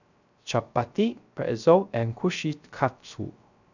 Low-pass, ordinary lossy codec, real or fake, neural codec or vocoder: 7.2 kHz; none; fake; codec, 16 kHz, 0.3 kbps, FocalCodec